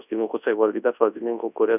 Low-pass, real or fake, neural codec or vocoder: 3.6 kHz; fake; codec, 24 kHz, 0.9 kbps, WavTokenizer, large speech release